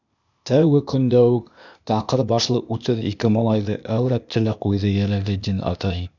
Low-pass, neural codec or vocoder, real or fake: 7.2 kHz; codec, 16 kHz, 0.8 kbps, ZipCodec; fake